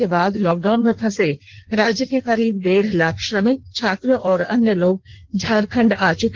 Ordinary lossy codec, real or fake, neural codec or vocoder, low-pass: Opus, 16 kbps; fake; codec, 16 kHz in and 24 kHz out, 1.1 kbps, FireRedTTS-2 codec; 7.2 kHz